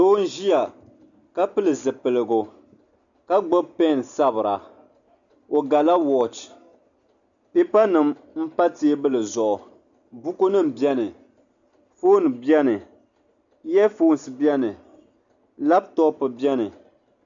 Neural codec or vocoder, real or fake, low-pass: none; real; 7.2 kHz